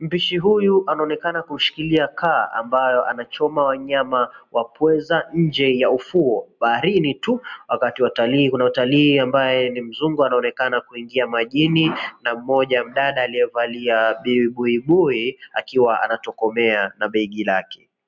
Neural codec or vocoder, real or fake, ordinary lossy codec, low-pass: none; real; MP3, 64 kbps; 7.2 kHz